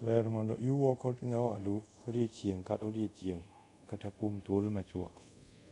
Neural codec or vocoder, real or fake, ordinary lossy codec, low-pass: codec, 24 kHz, 0.5 kbps, DualCodec; fake; none; 10.8 kHz